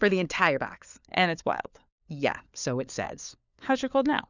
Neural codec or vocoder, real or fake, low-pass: codec, 16 kHz, 4 kbps, FunCodec, trained on LibriTTS, 50 frames a second; fake; 7.2 kHz